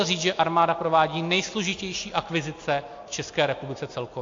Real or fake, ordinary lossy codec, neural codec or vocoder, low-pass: real; AAC, 48 kbps; none; 7.2 kHz